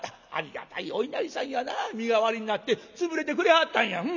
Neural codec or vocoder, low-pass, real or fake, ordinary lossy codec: none; 7.2 kHz; real; none